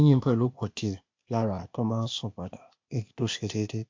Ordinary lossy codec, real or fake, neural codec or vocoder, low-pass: MP3, 48 kbps; fake; codec, 16 kHz, 0.8 kbps, ZipCodec; 7.2 kHz